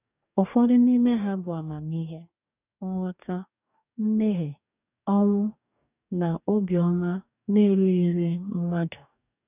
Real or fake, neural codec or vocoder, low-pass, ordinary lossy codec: fake; codec, 44.1 kHz, 2.6 kbps, DAC; 3.6 kHz; none